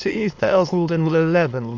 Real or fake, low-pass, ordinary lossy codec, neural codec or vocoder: fake; 7.2 kHz; AAC, 48 kbps; autoencoder, 22.05 kHz, a latent of 192 numbers a frame, VITS, trained on many speakers